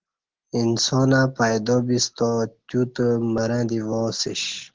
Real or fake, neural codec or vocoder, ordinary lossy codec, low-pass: real; none; Opus, 16 kbps; 7.2 kHz